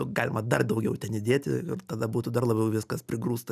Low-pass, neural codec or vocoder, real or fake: 14.4 kHz; none; real